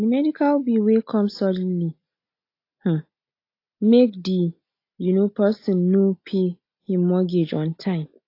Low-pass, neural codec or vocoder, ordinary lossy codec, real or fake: 5.4 kHz; none; AAC, 32 kbps; real